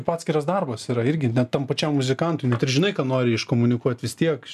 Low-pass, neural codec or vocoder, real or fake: 14.4 kHz; none; real